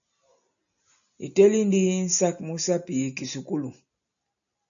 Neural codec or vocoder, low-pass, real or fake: none; 7.2 kHz; real